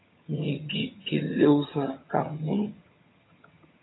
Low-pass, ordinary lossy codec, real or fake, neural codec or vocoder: 7.2 kHz; AAC, 16 kbps; fake; vocoder, 22.05 kHz, 80 mel bands, HiFi-GAN